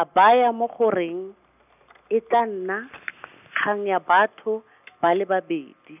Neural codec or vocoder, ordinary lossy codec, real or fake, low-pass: none; none; real; 3.6 kHz